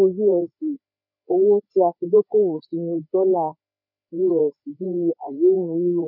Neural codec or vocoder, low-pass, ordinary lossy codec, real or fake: codec, 16 kHz, 4 kbps, FreqCodec, larger model; 5.4 kHz; none; fake